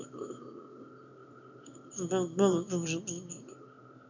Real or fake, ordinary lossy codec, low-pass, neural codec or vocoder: fake; none; 7.2 kHz; autoencoder, 22.05 kHz, a latent of 192 numbers a frame, VITS, trained on one speaker